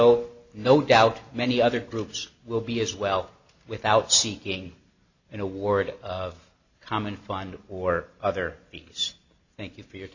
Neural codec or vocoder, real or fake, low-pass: none; real; 7.2 kHz